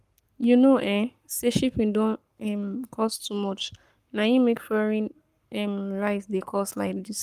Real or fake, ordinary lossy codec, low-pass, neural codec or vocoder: fake; Opus, 32 kbps; 14.4 kHz; codec, 44.1 kHz, 7.8 kbps, Pupu-Codec